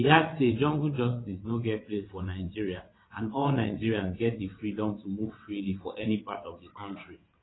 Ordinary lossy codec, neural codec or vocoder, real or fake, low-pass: AAC, 16 kbps; vocoder, 22.05 kHz, 80 mel bands, WaveNeXt; fake; 7.2 kHz